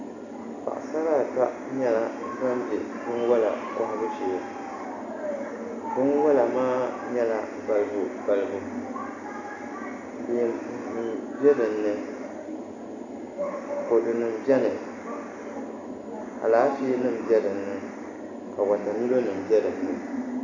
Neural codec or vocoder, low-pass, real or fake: none; 7.2 kHz; real